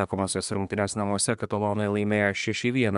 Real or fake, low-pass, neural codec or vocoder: fake; 10.8 kHz; codec, 24 kHz, 1 kbps, SNAC